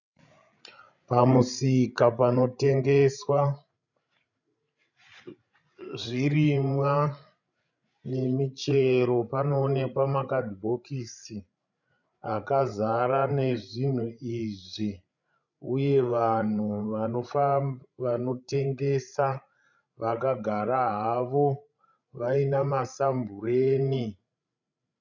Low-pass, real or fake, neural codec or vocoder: 7.2 kHz; fake; codec, 16 kHz, 16 kbps, FreqCodec, larger model